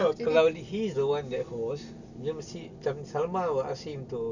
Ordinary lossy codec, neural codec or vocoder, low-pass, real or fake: none; none; 7.2 kHz; real